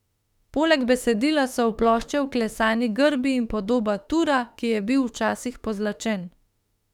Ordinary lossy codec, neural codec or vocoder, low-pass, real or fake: none; autoencoder, 48 kHz, 32 numbers a frame, DAC-VAE, trained on Japanese speech; 19.8 kHz; fake